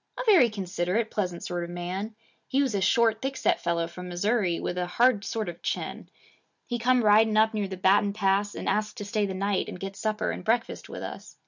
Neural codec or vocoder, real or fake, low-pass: none; real; 7.2 kHz